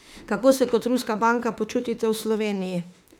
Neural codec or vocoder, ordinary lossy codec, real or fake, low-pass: autoencoder, 48 kHz, 32 numbers a frame, DAC-VAE, trained on Japanese speech; none; fake; 19.8 kHz